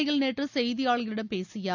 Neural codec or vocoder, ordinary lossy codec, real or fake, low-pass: none; none; real; 7.2 kHz